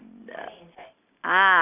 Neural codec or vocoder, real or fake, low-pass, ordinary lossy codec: none; real; 3.6 kHz; none